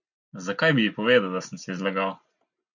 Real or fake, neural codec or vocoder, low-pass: real; none; 7.2 kHz